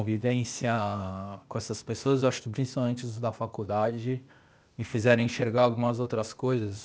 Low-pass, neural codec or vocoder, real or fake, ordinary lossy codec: none; codec, 16 kHz, 0.8 kbps, ZipCodec; fake; none